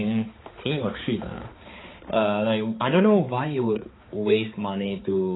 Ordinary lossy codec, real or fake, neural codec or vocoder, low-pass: AAC, 16 kbps; fake; codec, 16 kHz, 4 kbps, X-Codec, HuBERT features, trained on balanced general audio; 7.2 kHz